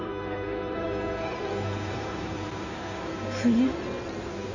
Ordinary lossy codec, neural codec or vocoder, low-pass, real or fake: none; codec, 16 kHz in and 24 kHz out, 2.2 kbps, FireRedTTS-2 codec; 7.2 kHz; fake